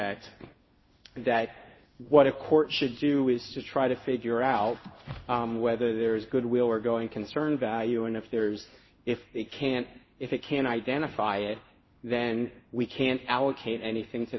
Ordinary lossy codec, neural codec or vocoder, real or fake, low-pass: MP3, 24 kbps; codec, 16 kHz in and 24 kHz out, 1 kbps, XY-Tokenizer; fake; 7.2 kHz